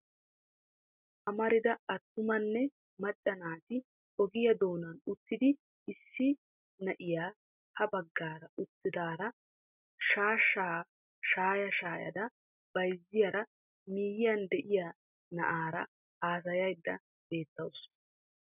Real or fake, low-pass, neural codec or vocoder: real; 3.6 kHz; none